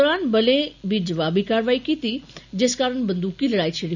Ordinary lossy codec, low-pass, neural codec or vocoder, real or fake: none; 7.2 kHz; none; real